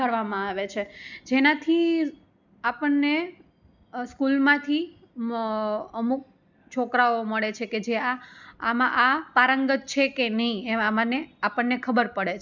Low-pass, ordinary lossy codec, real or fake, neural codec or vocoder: 7.2 kHz; none; real; none